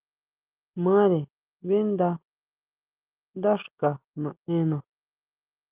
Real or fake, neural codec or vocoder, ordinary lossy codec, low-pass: real; none; Opus, 32 kbps; 3.6 kHz